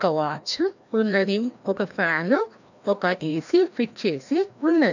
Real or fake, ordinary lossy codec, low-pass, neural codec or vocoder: fake; none; 7.2 kHz; codec, 16 kHz, 1 kbps, FreqCodec, larger model